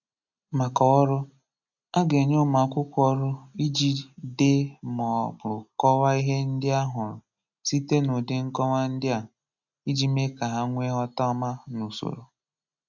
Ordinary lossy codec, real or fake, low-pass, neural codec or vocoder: none; real; 7.2 kHz; none